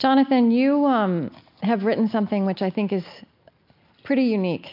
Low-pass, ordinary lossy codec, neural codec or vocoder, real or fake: 5.4 kHz; MP3, 48 kbps; none; real